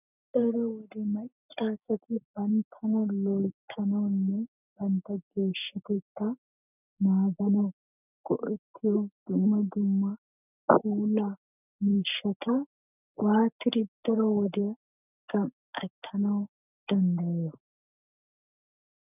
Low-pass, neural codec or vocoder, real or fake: 3.6 kHz; none; real